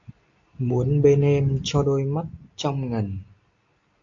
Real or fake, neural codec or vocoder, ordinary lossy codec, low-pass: real; none; AAC, 48 kbps; 7.2 kHz